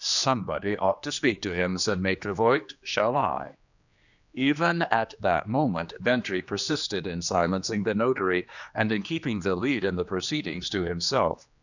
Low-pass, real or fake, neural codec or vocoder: 7.2 kHz; fake; codec, 16 kHz, 2 kbps, X-Codec, HuBERT features, trained on general audio